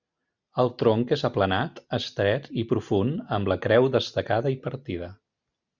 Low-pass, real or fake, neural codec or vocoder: 7.2 kHz; real; none